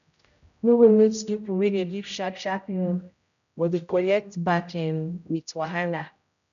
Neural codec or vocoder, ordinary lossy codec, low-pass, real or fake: codec, 16 kHz, 0.5 kbps, X-Codec, HuBERT features, trained on general audio; none; 7.2 kHz; fake